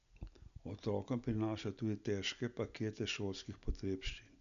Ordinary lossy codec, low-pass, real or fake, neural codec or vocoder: none; 7.2 kHz; real; none